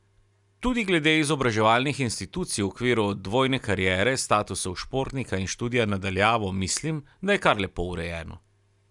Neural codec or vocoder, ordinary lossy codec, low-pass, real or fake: none; none; 10.8 kHz; real